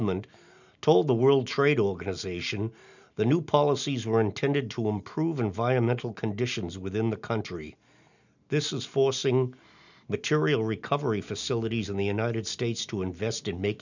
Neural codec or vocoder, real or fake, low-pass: none; real; 7.2 kHz